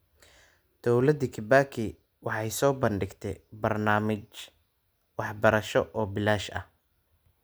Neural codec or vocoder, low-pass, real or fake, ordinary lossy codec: none; none; real; none